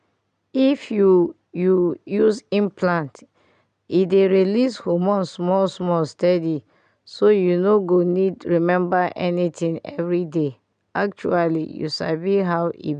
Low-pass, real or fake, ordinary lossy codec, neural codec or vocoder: 9.9 kHz; real; none; none